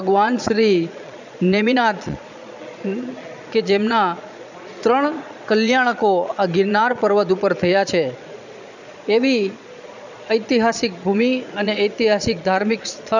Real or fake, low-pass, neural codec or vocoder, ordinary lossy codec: fake; 7.2 kHz; vocoder, 22.05 kHz, 80 mel bands, Vocos; none